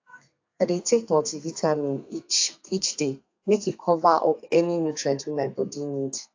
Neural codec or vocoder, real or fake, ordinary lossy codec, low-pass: codec, 32 kHz, 1.9 kbps, SNAC; fake; AAC, 48 kbps; 7.2 kHz